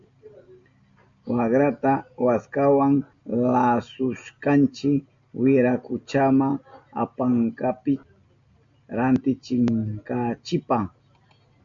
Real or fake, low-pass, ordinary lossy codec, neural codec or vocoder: real; 7.2 kHz; MP3, 64 kbps; none